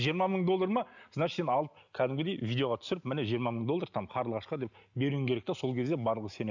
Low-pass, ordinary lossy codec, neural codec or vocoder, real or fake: 7.2 kHz; none; none; real